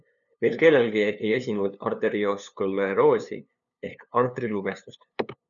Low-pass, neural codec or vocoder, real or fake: 7.2 kHz; codec, 16 kHz, 2 kbps, FunCodec, trained on LibriTTS, 25 frames a second; fake